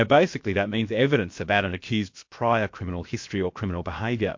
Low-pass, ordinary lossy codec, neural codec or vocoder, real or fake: 7.2 kHz; MP3, 48 kbps; codec, 16 kHz, about 1 kbps, DyCAST, with the encoder's durations; fake